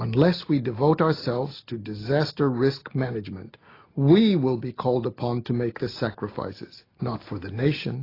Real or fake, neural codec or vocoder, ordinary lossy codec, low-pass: real; none; AAC, 24 kbps; 5.4 kHz